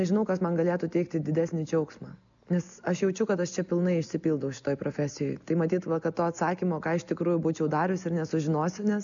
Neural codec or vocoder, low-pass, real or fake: none; 7.2 kHz; real